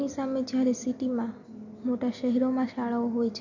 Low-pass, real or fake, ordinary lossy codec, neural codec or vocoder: 7.2 kHz; real; MP3, 48 kbps; none